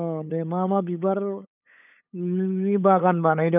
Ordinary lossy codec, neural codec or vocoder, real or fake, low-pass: none; codec, 16 kHz, 8 kbps, FunCodec, trained on LibriTTS, 25 frames a second; fake; 3.6 kHz